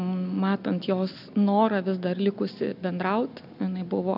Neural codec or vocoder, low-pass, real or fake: none; 5.4 kHz; real